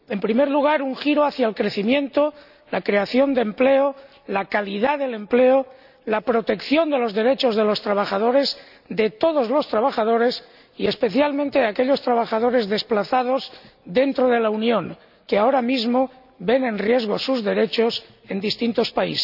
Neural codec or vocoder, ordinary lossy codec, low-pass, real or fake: none; none; 5.4 kHz; real